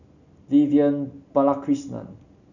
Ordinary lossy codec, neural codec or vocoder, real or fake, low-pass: none; none; real; 7.2 kHz